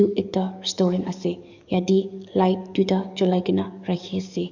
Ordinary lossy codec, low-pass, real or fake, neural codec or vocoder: none; 7.2 kHz; fake; codec, 44.1 kHz, 7.8 kbps, DAC